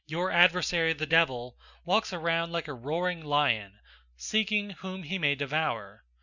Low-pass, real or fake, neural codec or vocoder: 7.2 kHz; real; none